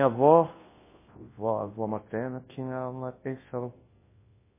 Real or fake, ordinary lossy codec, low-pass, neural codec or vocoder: fake; MP3, 16 kbps; 3.6 kHz; codec, 24 kHz, 0.9 kbps, WavTokenizer, large speech release